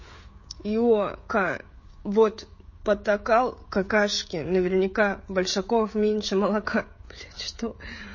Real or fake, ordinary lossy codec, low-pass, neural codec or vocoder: fake; MP3, 32 kbps; 7.2 kHz; codec, 16 kHz, 16 kbps, FreqCodec, smaller model